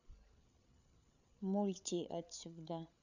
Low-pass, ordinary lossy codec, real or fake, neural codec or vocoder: 7.2 kHz; none; fake; codec, 16 kHz, 8 kbps, FreqCodec, larger model